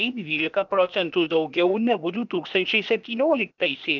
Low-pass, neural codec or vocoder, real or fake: 7.2 kHz; codec, 16 kHz, 0.8 kbps, ZipCodec; fake